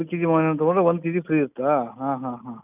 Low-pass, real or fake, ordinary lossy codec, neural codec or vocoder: 3.6 kHz; real; none; none